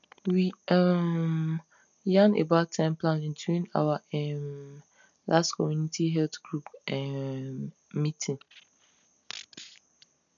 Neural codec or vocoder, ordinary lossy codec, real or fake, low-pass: none; none; real; 7.2 kHz